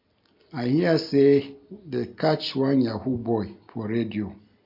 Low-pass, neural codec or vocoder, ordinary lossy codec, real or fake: 5.4 kHz; none; MP3, 48 kbps; real